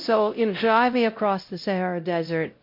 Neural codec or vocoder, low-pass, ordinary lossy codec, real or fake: codec, 16 kHz, 0.5 kbps, X-Codec, WavLM features, trained on Multilingual LibriSpeech; 5.4 kHz; MP3, 32 kbps; fake